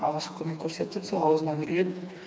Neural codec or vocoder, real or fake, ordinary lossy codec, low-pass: codec, 16 kHz, 2 kbps, FreqCodec, smaller model; fake; none; none